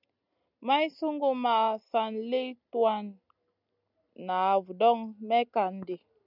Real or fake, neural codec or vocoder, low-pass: real; none; 5.4 kHz